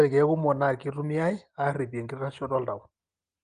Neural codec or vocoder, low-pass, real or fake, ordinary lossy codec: none; 9.9 kHz; real; Opus, 24 kbps